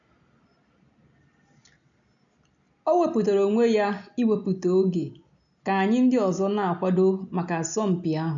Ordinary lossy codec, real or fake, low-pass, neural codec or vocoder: none; real; 7.2 kHz; none